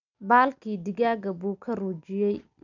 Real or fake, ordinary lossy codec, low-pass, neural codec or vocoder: real; none; 7.2 kHz; none